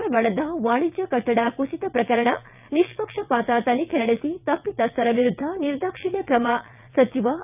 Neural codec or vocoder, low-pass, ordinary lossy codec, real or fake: vocoder, 22.05 kHz, 80 mel bands, WaveNeXt; 3.6 kHz; none; fake